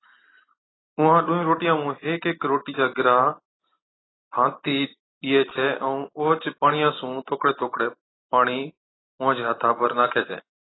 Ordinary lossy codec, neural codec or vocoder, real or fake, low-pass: AAC, 16 kbps; none; real; 7.2 kHz